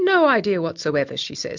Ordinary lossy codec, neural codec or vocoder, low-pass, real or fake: MP3, 64 kbps; none; 7.2 kHz; real